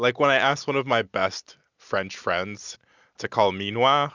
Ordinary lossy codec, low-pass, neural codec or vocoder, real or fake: Opus, 64 kbps; 7.2 kHz; none; real